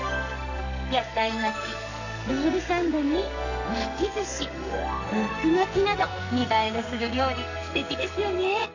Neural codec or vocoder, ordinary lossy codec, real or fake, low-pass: codec, 44.1 kHz, 2.6 kbps, SNAC; none; fake; 7.2 kHz